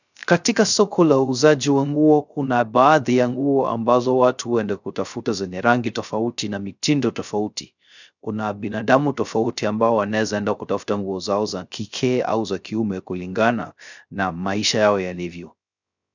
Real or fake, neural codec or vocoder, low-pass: fake; codec, 16 kHz, 0.3 kbps, FocalCodec; 7.2 kHz